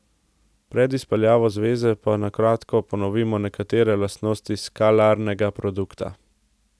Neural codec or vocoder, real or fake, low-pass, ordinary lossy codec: none; real; none; none